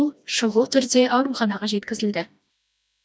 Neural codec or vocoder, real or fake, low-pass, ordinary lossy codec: codec, 16 kHz, 1 kbps, FreqCodec, smaller model; fake; none; none